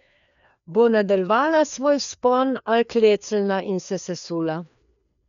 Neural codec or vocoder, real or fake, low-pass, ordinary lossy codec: codec, 16 kHz, 2 kbps, FreqCodec, larger model; fake; 7.2 kHz; none